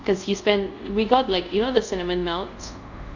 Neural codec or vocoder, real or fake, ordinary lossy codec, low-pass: codec, 24 kHz, 0.5 kbps, DualCodec; fake; none; 7.2 kHz